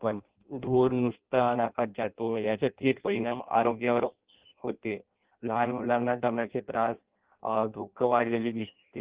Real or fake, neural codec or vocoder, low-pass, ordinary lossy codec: fake; codec, 16 kHz in and 24 kHz out, 0.6 kbps, FireRedTTS-2 codec; 3.6 kHz; Opus, 16 kbps